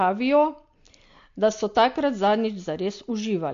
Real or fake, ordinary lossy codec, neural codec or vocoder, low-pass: real; AAC, 48 kbps; none; 7.2 kHz